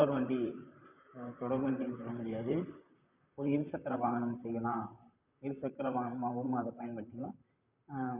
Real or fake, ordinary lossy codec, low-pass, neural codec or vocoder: fake; none; 3.6 kHz; vocoder, 44.1 kHz, 128 mel bands, Pupu-Vocoder